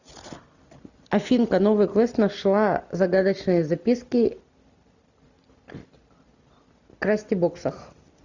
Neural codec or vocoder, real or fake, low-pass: none; real; 7.2 kHz